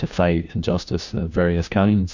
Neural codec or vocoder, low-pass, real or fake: codec, 16 kHz, 1 kbps, FunCodec, trained on LibriTTS, 50 frames a second; 7.2 kHz; fake